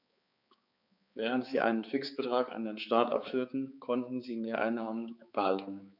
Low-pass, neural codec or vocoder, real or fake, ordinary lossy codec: 5.4 kHz; codec, 16 kHz, 4 kbps, X-Codec, HuBERT features, trained on balanced general audio; fake; none